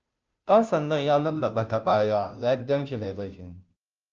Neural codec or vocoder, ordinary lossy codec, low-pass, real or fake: codec, 16 kHz, 0.5 kbps, FunCodec, trained on Chinese and English, 25 frames a second; Opus, 24 kbps; 7.2 kHz; fake